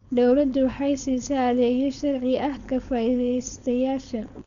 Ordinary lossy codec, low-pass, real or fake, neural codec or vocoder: none; 7.2 kHz; fake; codec, 16 kHz, 4.8 kbps, FACodec